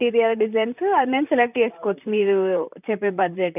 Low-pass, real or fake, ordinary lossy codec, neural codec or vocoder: 3.6 kHz; fake; none; vocoder, 44.1 kHz, 128 mel bands, Pupu-Vocoder